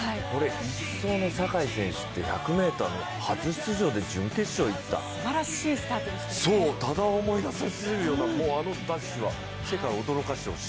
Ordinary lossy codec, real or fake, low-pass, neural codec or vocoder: none; real; none; none